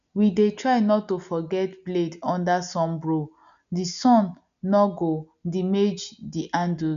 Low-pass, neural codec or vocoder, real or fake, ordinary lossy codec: 7.2 kHz; none; real; MP3, 96 kbps